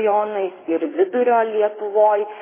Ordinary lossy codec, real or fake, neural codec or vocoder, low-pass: MP3, 16 kbps; fake; codec, 16 kHz in and 24 kHz out, 2.2 kbps, FireRedTTS-2 codec; 3.6 kHz